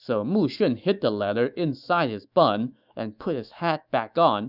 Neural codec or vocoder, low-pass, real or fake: autoencoder, 48 kHz, 128 numbers a frame, DAC-VAE, trained on Japanese speech; 5.4 kHz; fake